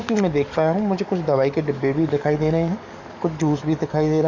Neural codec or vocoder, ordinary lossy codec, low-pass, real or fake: codec, 44.1 kHz, 7.8 kbps, DAC; none; 7.2 kHz; fake